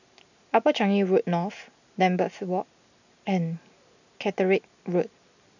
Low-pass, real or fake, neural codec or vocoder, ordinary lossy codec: 7.2 kHz; real; none; none